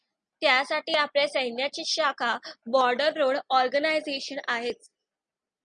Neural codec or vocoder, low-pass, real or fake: none; 9.9 kHz; real